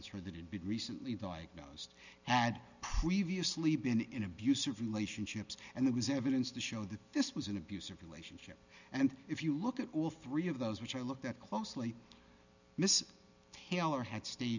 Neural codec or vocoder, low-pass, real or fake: none; 7.2 kHz; real